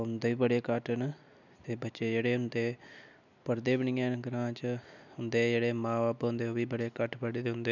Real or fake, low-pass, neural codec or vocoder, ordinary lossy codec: real; 7.2 kHz; none; none